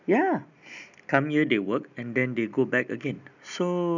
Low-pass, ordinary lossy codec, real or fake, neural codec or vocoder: 7.2 kHz; none; real; none